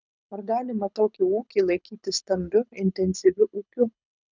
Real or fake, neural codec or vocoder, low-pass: fake; codec, 24 kHz, 6 kbps, HILCodec; 7.2 kHz